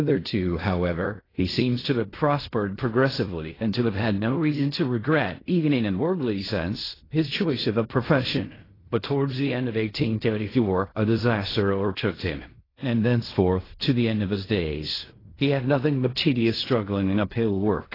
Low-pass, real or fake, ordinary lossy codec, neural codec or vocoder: 5.4 kHz; fake; AAC, 24 kbps; codec, 16 kHz in and 24 kHz out, 0.4 kbps, LongCat-Audio-Codec, fine tuned four codebook decoder